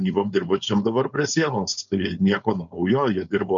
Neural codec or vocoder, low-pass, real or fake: codec, 16 kHz, 4.8 kbps, FACodec; 7.2 kHz; fake